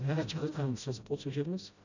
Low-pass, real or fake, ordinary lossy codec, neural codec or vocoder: 7.2 kHz; fake; none; codec, 16 kHz, 0.5 kbps, FreqCodec, smaller model